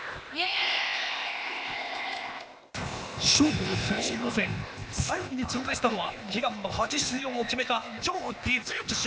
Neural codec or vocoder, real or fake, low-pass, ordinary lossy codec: codec, 16 kHz, 0.8 kbps, ZipCodec; fake; none; none